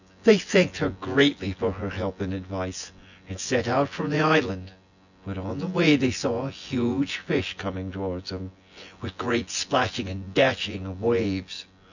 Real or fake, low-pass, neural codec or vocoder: fake; 7.2 kHz; vocoder, 24 kHz, 100 mel bands, Vocos